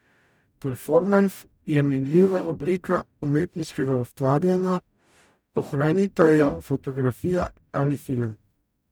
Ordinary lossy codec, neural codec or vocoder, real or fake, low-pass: none; codec, 44.1 kHz, 0.9 kbps, DAC; fake; none